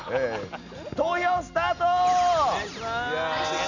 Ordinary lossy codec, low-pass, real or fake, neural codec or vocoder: none; 7.2 kHz; real; none